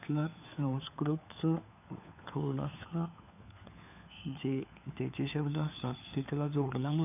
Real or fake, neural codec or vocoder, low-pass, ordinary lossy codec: fake; codec, 16 kHz, 4 kbps, FunCodec, trained on LibriTTS, 50 frames a second; 3.6 kHz; none